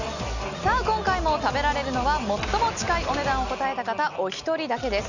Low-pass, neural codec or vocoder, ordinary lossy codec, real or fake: 7.2 kHz; none; none; real